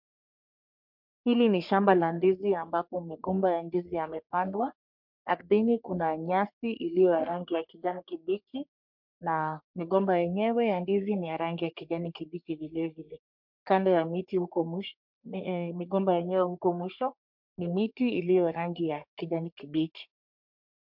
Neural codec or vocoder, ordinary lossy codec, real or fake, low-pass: codec, 44.1 kHz, 3.4 kbps, Pupu-Codec; MP3, 48 kbps; fake; 5.4 kHz